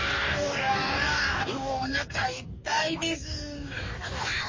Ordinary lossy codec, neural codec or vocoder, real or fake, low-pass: MP3, 32 kbps; codec, 44.1 kHz, 2.6 kbps, DAC; fake; 7.2 kHz